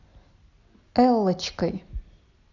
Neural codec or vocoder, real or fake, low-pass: none; real; 7.2 kHz